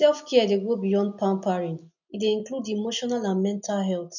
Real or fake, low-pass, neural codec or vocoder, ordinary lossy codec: real; 7.2 kHz; none; none